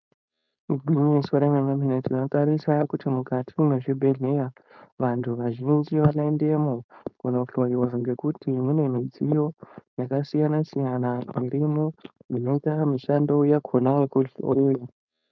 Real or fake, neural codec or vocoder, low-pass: fake; codec, 16 kHz, 4.8 kbps, FACodec; 7.2 kHz